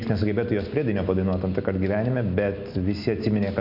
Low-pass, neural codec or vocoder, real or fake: 5.4 kHz; none; real